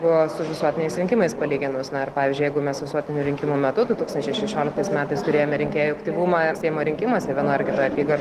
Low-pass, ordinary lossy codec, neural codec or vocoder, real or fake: 14.4 kHz; Opus, 16 kbps; none; real